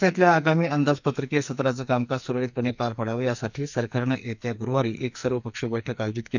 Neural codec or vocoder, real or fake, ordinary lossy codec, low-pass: codec, 44.1 kHz, 2.6 kbps, SNAC; fake; none; 7.2 kHz